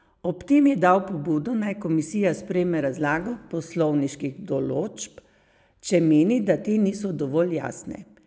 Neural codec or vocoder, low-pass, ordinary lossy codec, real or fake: none; none; none; real